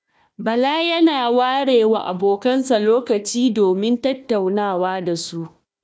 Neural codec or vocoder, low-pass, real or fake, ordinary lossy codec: codec, 16 kHz, 1 kbps, FunCodec, trained on Chinese and English, 50 frames a second; none; fake; none